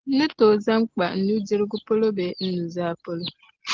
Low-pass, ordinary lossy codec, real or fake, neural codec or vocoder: 7.2 kHz; Opus, 16 kbps; real; none